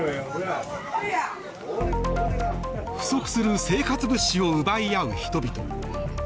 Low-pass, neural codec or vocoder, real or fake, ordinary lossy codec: none; none; real; none